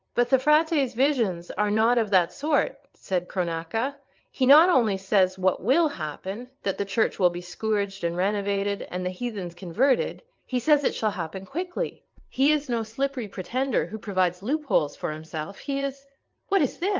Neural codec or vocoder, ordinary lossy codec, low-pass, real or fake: vocoder, 22.05 kHz, 80 mel bands, WaveNeXt; Opus, 32 kbps; 7.2 kHz; fake